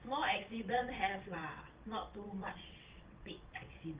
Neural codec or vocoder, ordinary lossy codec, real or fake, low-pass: vocoder, 22.05 kHz, 80 mel bands, WaveNeXt; Opus, 24 kbps; fake; 3.6 kHz